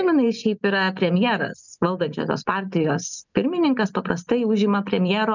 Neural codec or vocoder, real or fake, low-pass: none; real; 7.2 kHz